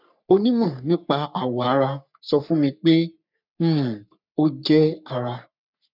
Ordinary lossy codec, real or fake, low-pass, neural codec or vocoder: none; fake; 5.4 kHz; codec, 44.1 kHz, 3.4 kbps, Pupu-Codec